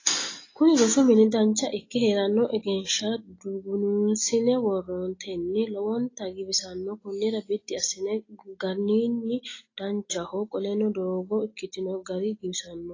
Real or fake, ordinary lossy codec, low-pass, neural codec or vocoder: real; AAC, 32 kbps; 7.2 kHz; none